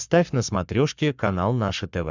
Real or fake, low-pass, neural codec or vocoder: fake; 7.2 kHz; codec, 44.1 kHz, 7.8 kbps, Pupu-Codec